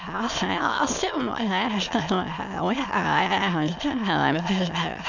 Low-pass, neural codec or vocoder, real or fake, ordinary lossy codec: 7.2 kHz; autoencoder, 22.05 kHz, a latent of 192 numbers a frame, VITS, trained on many speakers; fake; none